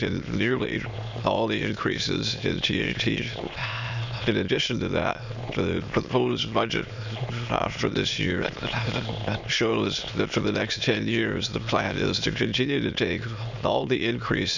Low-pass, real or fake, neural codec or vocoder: 7.2 kHz; fake; autoencoder, 22.05 kHz, a latent of 192 numbers a frame, VITS, trained on many speakers